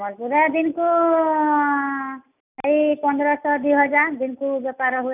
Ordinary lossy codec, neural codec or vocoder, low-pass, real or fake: none; none; 3.6 kHz; real